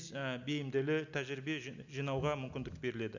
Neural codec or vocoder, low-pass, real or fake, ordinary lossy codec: none; 7.2 kHz; real; none